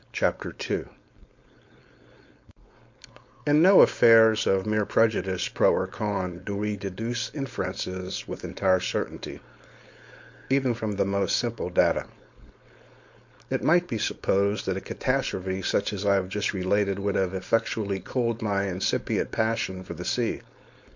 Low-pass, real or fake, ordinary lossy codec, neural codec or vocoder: 7.2 kHz; fake; MP3, 48 kbps; codec, 16 kHz, 4.8 kbps, FACodec